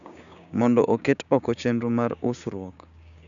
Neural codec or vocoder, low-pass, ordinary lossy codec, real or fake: codec, 16 kHz, 6 kbps, DAC; 7.2 kHz; none; fake